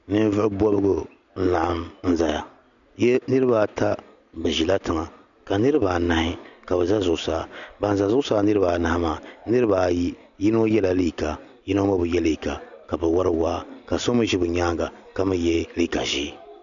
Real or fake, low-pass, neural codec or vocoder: real; 7.2 kHz; none